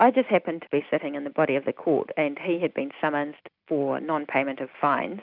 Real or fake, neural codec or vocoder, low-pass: real; none; 5.4 kHz